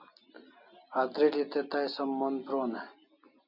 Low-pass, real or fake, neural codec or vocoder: 5.4 kHz; real; none